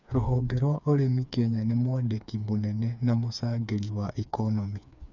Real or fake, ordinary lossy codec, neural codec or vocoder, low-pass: fake; none; codec, 16 kHz, 4 kbps, FreqCodec, smaller model; 7.2 kHz